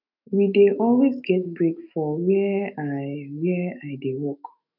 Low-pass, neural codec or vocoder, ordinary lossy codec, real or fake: 5.4 kHz; autoencoder, 48 kHz, 128 numbers a frame, DAC-VAE, trained on Japanese speech; none; fake